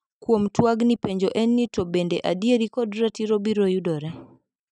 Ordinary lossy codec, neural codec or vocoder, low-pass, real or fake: none; none; 10.8 kHz; real